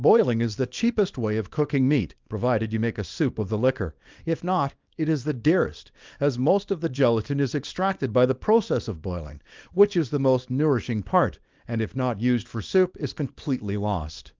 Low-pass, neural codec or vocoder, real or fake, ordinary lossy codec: 7.2 kHz; codec, 24 kHz, 0.9 kbps, WavTokenizer, small release; fake; Opus, 32 kbps